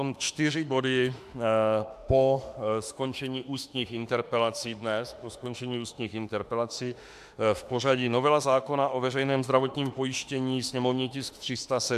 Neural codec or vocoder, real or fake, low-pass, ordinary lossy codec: autoencoder, 48 kHz, 32 numbers a frame, DAC-VAE, trained on Japanese speech; fake; 14.4 kHz; AAC, 96 kbps